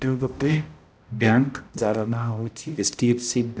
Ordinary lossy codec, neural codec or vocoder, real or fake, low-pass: none; codec, 16 kHz, 0.5 kbps, X-Codec, HuBERT features, trained on balanced general audio; fake; none